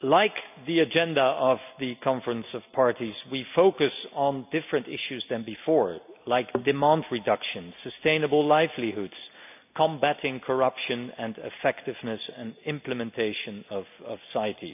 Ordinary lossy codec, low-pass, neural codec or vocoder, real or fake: none; 3.6 kHz; none; real